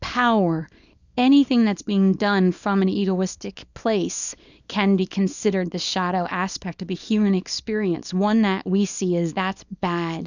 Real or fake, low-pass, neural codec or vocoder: fake; 7.2 kHz; codec, 24 kHz, 0.9 kbps, WavTokenizer, small release